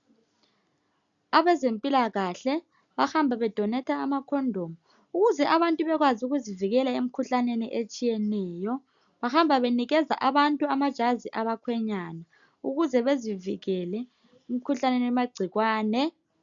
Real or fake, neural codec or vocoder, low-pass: real; none; 7.2 kHz